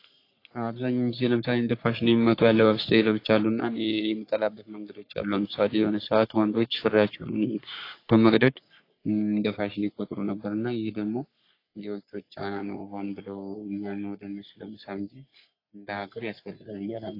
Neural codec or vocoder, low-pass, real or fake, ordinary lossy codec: codec, 44.1 kHz, 3.4 kbps, Pupu-Codec; 5.4 kHz; fake; AAC, 32 kbps